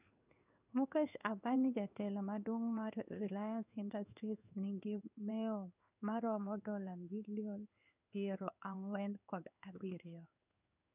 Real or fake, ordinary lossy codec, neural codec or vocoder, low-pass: fake; none; codec, 16 kHz in and 24 kHz out, 1 kbps, XY-Tokenizer; 3.6 kHz